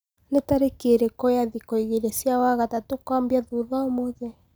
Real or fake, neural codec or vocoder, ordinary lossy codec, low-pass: real; none; none; none